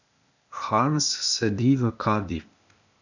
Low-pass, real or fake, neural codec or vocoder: 7.2 kHz; fake; codec, 16 kHz, 0.8 kbps, ZipCodec